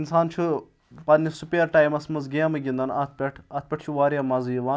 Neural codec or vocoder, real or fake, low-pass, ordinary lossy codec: none; real; none; none